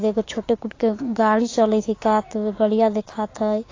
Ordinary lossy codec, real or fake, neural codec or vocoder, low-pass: AAC, 32 kbps; fake; codec, 16 kHz, 6 kbps, DAC; 7.2 kHz